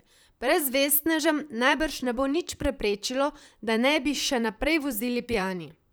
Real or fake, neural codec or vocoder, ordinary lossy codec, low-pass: fake; vocoder, 44.1 kHz, 128 mel bands, Pupu-Vocoder; none; none